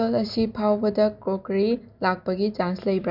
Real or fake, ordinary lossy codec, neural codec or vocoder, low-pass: real; none; none; 5.4 kHz